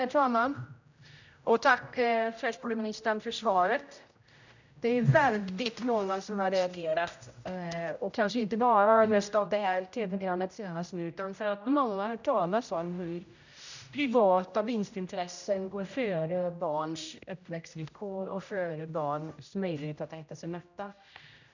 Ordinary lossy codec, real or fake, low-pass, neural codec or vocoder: none; fake; 7.2 kHz; codec, 16 kHz, 0.5 kbps, X-Codec, HuBERT features, trained on general audio